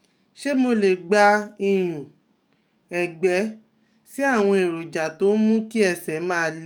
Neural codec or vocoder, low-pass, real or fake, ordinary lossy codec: codec, 44.1 kHz, 7.8 kbps, DAC; 19.8 kHz; fake; none